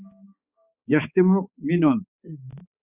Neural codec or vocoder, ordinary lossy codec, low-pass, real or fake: codec, 16 kHz, 4 kbps, X-Codec, HuBERT features, trained on balanced general audio; Opus, 64 kbps; 3.6 kHz; fake